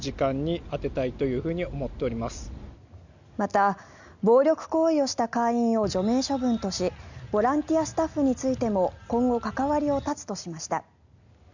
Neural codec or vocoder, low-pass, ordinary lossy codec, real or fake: none; 7.2 kHz; none; real